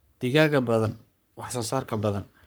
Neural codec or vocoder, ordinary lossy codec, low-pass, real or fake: codec, 44.1 kHz, 3.4 kbps, Pupu-Codec; none; none; fake